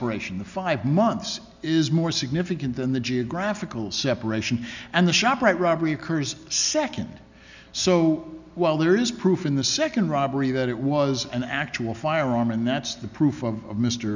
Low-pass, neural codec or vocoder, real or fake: 7.2 kHz; none; real